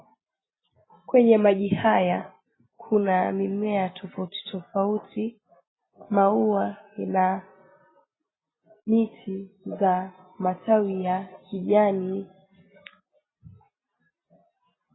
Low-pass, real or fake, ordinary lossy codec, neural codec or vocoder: 7.2 kHz; real; AAC, 16 kbps; none